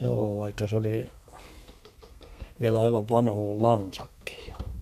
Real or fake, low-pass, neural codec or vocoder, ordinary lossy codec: fake; 14.4 kHz; codec, 32 kHz, 1.9 kbps, SNAC; none